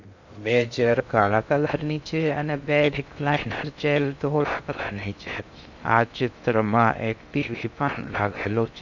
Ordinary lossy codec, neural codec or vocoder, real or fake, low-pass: none; codec, 16 kHz in and 24 kHz out, 0.6 kbps, FocalCodec, streaming, 2048 codes; fake; 7.2 kHz